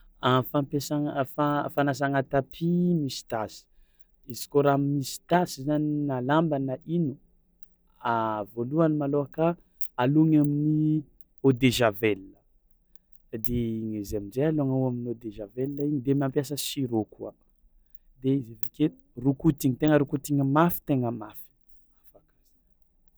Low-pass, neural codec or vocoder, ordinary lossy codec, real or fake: none; none; none; real